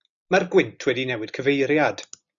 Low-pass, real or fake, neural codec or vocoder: 7.2 kHz; real; none